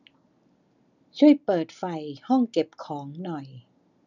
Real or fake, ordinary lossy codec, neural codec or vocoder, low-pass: real; none; none; 7.2 kHz